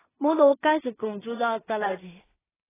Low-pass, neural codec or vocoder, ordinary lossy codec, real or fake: 3.6 kHz; codec, 16 kHz in and 24 kHz out, 0.4 kbps, LongCat-Audio-Codec, two codebook decoder; AAC, 16 kbps; fake